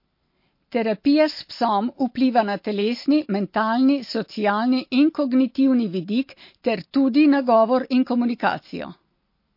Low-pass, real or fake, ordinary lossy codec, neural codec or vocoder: 5.4 kHz; real; MP3, 32 kbps; none